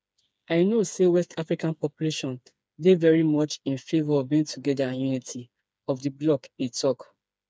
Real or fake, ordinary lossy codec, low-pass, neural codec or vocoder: fake; none; none; codec, 16 kHz, 4 kbps, FreqCodec, smaller model